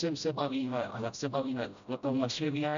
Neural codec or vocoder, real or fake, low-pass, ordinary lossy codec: codec, 16 kHz, 0.5 kbps, FreqCodec, smaller model; fake; 7.2 kHz; MP3, 64 kbps